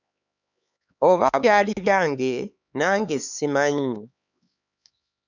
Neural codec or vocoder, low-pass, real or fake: codec, 16 kHz, 4 kbps, X-Codec, HuBERT features, trained on LibriSpeech; 7.2 kHz; fake